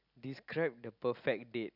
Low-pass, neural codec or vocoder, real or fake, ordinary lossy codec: 5.4 kHz; none; real; none